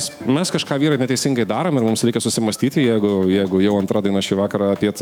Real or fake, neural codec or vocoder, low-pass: fake; autoencoder, 48 kHz, 128 numbers a frame, DAC-VAE, trained on Japanese speech; 19.8 kHz